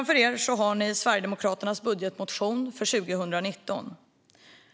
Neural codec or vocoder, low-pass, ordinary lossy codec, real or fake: none; none; none; real